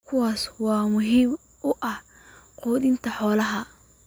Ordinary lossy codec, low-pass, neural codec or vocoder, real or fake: none; none; none; real